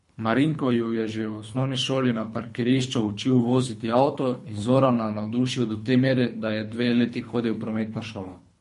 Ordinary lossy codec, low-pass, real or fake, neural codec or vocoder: MP3, 48 kbps; 10.8 kHz; fake; codec, 24 kHz, 3 kbps, HILCodec